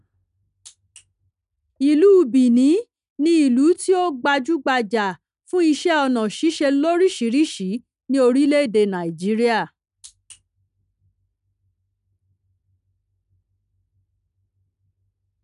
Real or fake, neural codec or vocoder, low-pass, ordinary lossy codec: real; none; 10.8 kHz; none